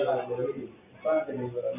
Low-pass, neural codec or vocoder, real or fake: 3.6 kHz; none; real